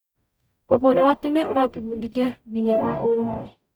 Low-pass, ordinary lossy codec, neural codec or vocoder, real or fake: none; none; codec, 44.1 kHz, 0.9 kbps, DAC; fake